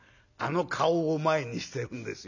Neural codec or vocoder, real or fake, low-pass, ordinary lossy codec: none; real; 7.2 kHz; none